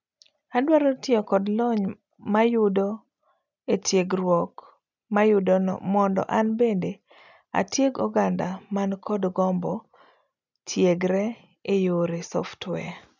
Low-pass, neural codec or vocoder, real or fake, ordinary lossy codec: 7.2 kHz; none; real; none